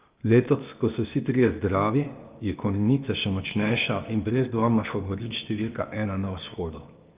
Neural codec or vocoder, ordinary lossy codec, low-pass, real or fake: codec, 16 kHz, 0.8 kbps, ZipCodec; Opus, 32 kbps; 3.6 kHz; fake